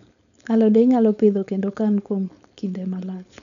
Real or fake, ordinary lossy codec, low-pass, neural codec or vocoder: fake; none; 7.2 kHz; codec, 16 kHz, 4.8 kbps, FACodec